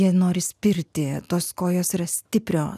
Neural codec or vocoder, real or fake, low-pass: none; real; 14.4 kHz